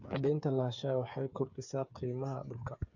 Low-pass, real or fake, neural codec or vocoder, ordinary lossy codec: 7.2 kHz; fake; codec, 16 kHz, 8 kbps, FreqCodec, smaller model; none